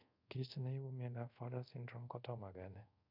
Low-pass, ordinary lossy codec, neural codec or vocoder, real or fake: 5.4 kHz; none; codec, 24 kHz, 0.5 kbps, DualCodec; fake